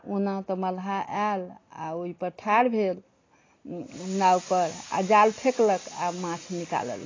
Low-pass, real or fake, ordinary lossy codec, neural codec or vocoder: 7.2 kHz; real; AAC, 32 kbps; none